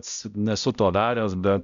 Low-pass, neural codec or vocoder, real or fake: 7.2 kHz; codec, 16 kHz, 0.5 kbps, X-Codec, HuBERT features, trained on balanced general audio; fake